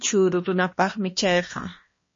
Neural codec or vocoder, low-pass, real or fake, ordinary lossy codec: codec, 16 kHz, 1 kbps, X-Codec, HuBERT features, trained on balanced general audio; 7.2 kHz; fake; MP3, 32 kbps